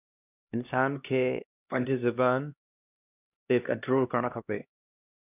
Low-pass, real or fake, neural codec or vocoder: 3.6 kHz; fake; codec, 16 kHz, 1 kbps, X-Codec, HuBERT features, trained on LibriSpeech